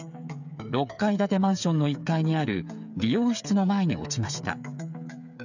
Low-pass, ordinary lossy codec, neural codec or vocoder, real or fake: 7.2 kHz; none; codec, 16 kHz, 8 kbps, FreqCodec, smaller model; fake